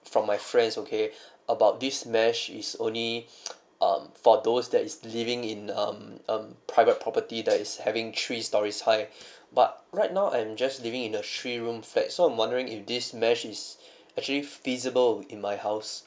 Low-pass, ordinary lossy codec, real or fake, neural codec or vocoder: none; none; real; none